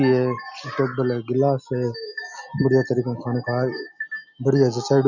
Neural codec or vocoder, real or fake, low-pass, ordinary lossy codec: none; real; 7.2 kHz; none